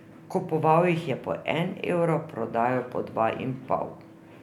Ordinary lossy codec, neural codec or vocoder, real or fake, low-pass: none; none; real; 19.8 kHz